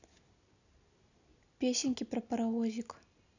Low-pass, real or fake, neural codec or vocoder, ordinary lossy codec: 7.2 kHz; real; none; none